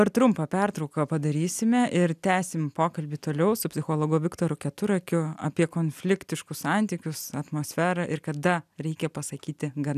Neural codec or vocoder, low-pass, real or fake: none; 14.4 kHz; real